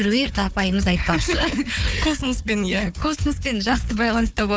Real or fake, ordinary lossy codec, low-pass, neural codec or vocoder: fake; none; none; codec, 16 kHz, 4 kbps, FunCodec, trained on Chinese and English, 50 frames a second